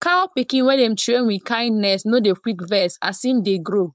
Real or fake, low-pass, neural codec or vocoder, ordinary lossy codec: fake; none; codec, 16 kHz, 4.8 kbps, FACodec; none